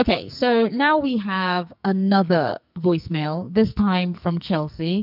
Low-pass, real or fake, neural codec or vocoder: 5.4 kHz; fake; codec, 44.1 kHz, 2.6 kbps, SNAC